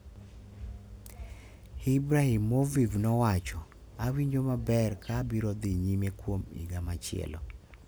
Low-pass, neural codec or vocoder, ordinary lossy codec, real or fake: none; none; none; real